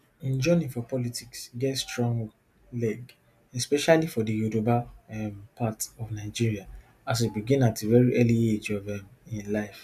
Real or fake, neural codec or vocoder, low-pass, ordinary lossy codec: real; none; 14.4 kHz; none